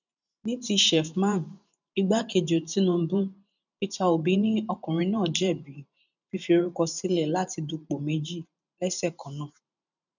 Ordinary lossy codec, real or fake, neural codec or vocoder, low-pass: none; fake; vocoder, 44.1 kHz, 128 mel bands every 512 samples, BigVGAN v2; 7.2 kHz